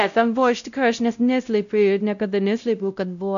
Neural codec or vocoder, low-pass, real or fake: codec, 16 kHz, 0.5 kbps, X-Codec, WavLM features, trained on Multilingual LibriSpeech; 7.2 kHz; fake